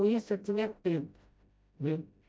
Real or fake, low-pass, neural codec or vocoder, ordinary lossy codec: fake; none; codec, 16 kHz, 0.5 kbps, FreqCodec, smaller model; none